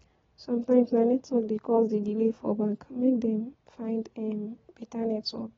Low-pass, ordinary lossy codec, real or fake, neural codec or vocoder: 7.2 kHz; AAC, 24 kbps; real; none